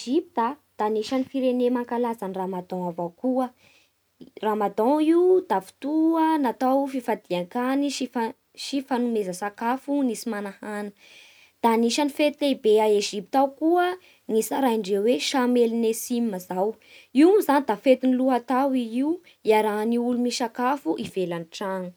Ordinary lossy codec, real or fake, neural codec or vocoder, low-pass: none; real; none; none